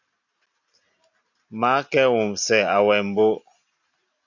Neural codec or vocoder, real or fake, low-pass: none; real; 7.2 kHz